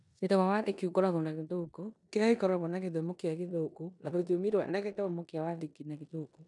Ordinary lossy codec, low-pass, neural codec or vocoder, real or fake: none; 10.8 kHz; codec, 16 kHz in and 24 kHz out, 0.9 kbps, LongCat-Audio-Codec, four codebook decoder; fake